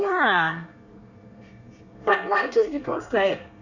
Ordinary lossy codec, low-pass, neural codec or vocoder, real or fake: none; 7.2 kHz; codec, 24 kHz, 1 kbps, SNAC; fake